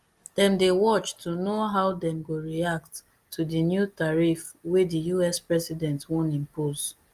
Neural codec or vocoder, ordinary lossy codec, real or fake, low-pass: none; Opus, 32 kbps; real; 14.4 kHz